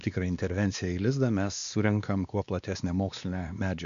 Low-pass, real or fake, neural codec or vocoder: 7.2 kHz; fake; codec, 16 kHz, 2 kbps, X-Codec, WavLM features, trained on Multilingual LibriSpeech